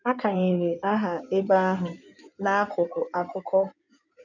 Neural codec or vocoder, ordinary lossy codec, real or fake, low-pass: codec, 16 kHz in and 24 kHz out, 2.2 kbps, FireRedTTS-2 codec; none; fake; 7.2 kHz